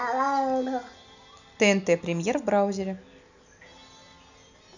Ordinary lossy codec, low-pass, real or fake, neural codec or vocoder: none; 7.2 kHz; real; none